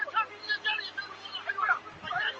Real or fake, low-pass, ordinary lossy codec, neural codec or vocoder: real; 7.2 kHz; Opus, 24 kbps; none